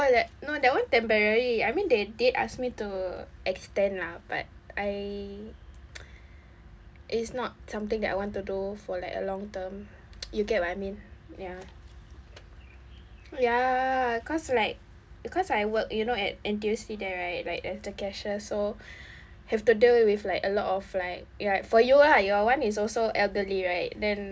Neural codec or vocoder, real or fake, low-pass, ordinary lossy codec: none; real; none; none